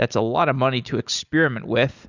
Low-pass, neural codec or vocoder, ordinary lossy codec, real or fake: 7.2 kHz; none; Opus, 64 kbps; real